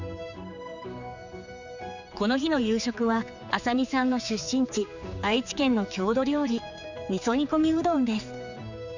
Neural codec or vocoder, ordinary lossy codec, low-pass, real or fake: codec, 16 kHz, 4 kbps, X-Codec, HuBERT features, trained on general audio; none; 7.2 kHz; fake